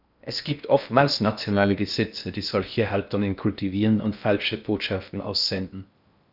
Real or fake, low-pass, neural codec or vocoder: fake; 5.4 kHz; codec, 16 kHz in and 24 kHz out, 0.6 kbps, FocalCodec, streaming, 4096 codes